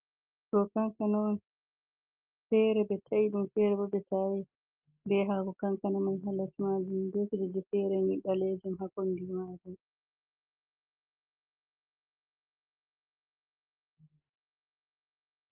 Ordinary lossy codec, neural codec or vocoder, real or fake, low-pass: Opus, 24 kbps; none; real; 3.6 kHz